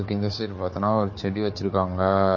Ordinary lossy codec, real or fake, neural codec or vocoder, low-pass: MP3, 32 kbps; fake; codec, 16 kHz, 4 kbps, FunCodec, trained on Chinese and English, 50 frames a second; 7.2 kHz